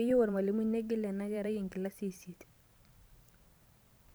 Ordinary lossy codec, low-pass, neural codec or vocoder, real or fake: none; none; vocoder, 44.1 kHz, 128 mel bands every 512 samples, BigVGAN v2; fake